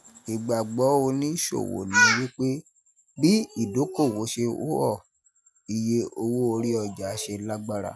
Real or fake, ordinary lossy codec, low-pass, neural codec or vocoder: real; none; none; none